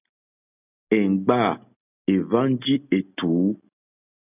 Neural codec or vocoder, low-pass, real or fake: none; 3.6 kHz; real